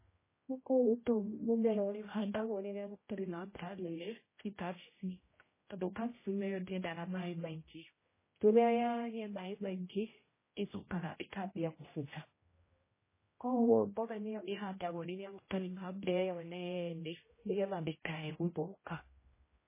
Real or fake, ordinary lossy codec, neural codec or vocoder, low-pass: fake; MP3, 16 kbps; codec, 16 kHz, 0.5 kbps, X-Codec, HuBERT features, trained on general audio; 3.6 kHz